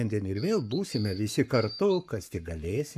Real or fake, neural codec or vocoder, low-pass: fake; codec, 44.1 kHz, 7.8 kbps, Pupu-Codec; 14.4 kHz